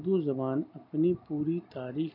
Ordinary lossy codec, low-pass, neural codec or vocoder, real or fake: none; 5.4 kHz; none; real